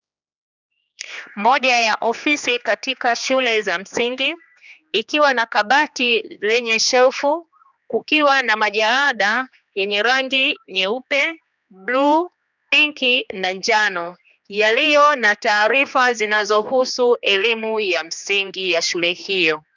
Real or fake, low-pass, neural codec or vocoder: fake; 7.2 kHz; codec, 16 kHz, 2 kbps, X-Codec, HuBERT features, trained on general audio